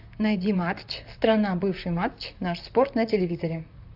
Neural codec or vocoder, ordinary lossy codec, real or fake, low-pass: vocoder, 44.1 kHz, 128 mel bands, Pupu-Vocoder; AAC, 48 kbps; fake; 5.4 kHz